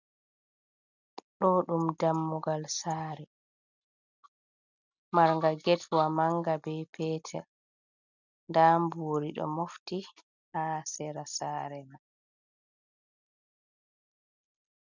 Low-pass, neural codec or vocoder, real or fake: 7.2 kHz; none; real